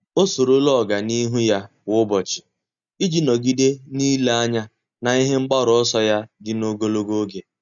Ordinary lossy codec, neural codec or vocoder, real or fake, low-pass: none; none; real; 7.2 kHz